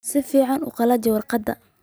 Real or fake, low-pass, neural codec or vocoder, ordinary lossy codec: real; none; none; none